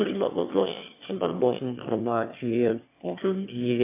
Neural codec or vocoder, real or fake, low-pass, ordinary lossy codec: autoencoder, 22.05 kHz, a latent of 192 numbers a frame, VITS, trained on one speaker; fake; 3.6 kHz; AAC, 24 kbps